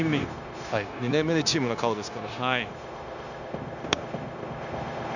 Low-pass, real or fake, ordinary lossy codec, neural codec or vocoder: 7.2 kHz; fake; none; codec, 16 kHz, 0.9 kbps, LongCat-Audio-Codec